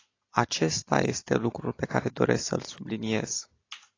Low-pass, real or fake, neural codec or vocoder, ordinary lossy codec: 7.2 kHz; real; none; AAC, 32 kbps